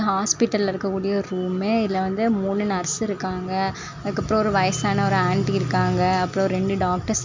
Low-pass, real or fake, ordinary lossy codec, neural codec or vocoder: 7.2 kHz; real; MP3, 64 kbps; none